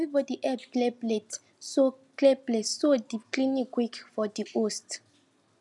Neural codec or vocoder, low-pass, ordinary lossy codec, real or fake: none; 10.8 kHz; none; real